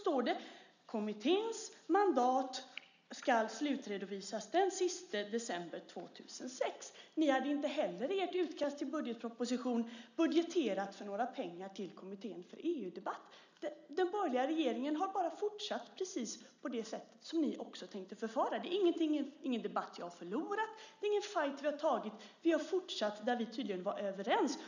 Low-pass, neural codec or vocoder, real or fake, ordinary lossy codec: 7.2 kHz; none; real; none